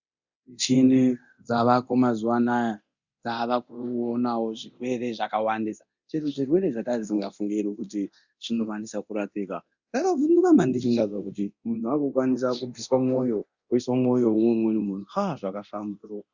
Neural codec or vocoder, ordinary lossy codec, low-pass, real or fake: codec, 24 kHz, 0.9 kbps, DualCodec; Opus, 64 kbps; 7.2 kHz; fake